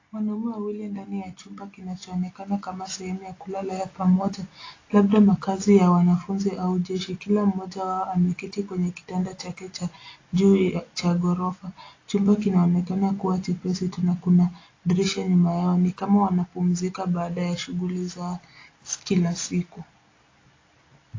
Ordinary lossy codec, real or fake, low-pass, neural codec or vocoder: AAC, 32 kbps; real; 7.2 kHz; none